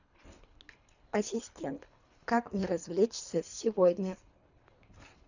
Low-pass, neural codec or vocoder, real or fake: 7.2 kHz; codec, 24 kHz, 3 kbps, HILCodec; fake